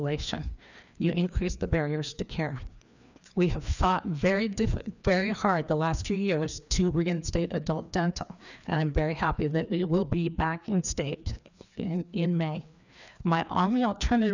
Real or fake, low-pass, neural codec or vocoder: fake; 7.2 kHz; codec, 16 kHz, 2 kbps, FreqCodec, larger model